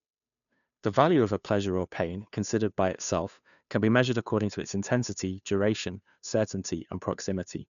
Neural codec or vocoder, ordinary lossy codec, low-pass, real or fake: codec, 16 kHz, 2 kbps, FunCodec, trained on Chinese and English, 25 frames a second; none; 7.2 kHz; fake